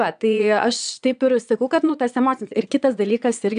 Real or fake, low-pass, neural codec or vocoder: fake; 9.9 kHz; vocoder, 22.05 kHz, 80 mel bands, Vocos